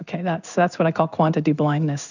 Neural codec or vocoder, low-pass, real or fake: codec, 16 kHz in and 24 kHz out, 1 kbps, XY-Tokenizer; 7.2 kHz; fake